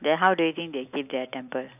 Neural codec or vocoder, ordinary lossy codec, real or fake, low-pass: none; none; real; 3.6 kHz